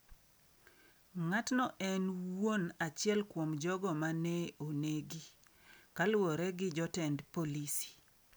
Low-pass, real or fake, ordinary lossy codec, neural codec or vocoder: none; real; none; none